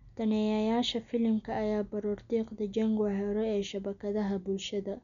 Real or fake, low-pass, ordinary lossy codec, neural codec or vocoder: real; 7.2 kHz; none; none